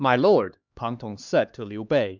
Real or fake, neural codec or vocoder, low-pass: fake; codec, 16 kHz, 2 kbps, X-Codec, HuBERT features, trained on LibriSpeech; 7.2 kHz